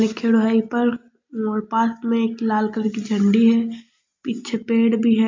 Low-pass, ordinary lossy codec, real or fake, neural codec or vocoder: 7.2 kHz; MP3, 48 kbps; real; none